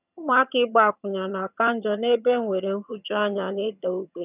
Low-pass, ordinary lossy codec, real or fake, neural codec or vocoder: 3.6 kHz; none; fake; vocoder, 22.05 kHz, 80 mel bands, HiFi-GAN